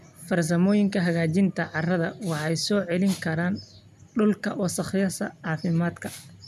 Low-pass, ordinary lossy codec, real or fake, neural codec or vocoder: 14.4 kHz; none; real; none